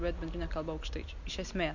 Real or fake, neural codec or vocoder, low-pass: real; none; 7.2 kHz